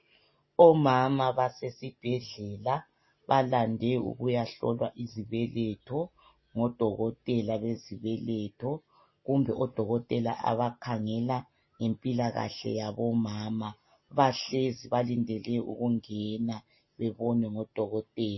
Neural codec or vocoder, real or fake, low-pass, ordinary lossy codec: none; real; 7.2 kHz; MP3, 24 kbps